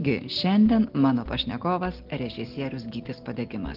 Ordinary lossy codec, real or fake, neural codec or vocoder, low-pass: Opus, 16 kbps; real; none; 5.4 kHz